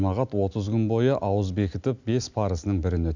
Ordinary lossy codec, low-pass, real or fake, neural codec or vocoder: none; 7.2 kHz; real; none